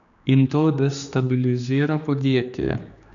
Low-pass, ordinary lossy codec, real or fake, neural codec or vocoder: 7.2 kHz; none; fake; codec, 16 kHz, 2 kbps, X-Codec, HuBERT features, trained on general audio